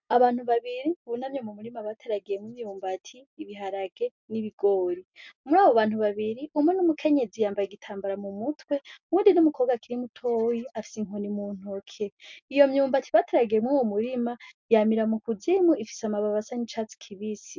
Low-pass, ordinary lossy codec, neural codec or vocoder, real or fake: 7.2 kHz; MP3, 64 kbps; none; real